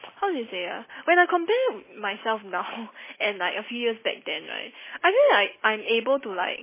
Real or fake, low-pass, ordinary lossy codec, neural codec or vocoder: real; 3.6 kHz; MP3, 16 kbps; none